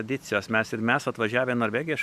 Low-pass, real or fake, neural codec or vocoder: 14.4 kHz; real; none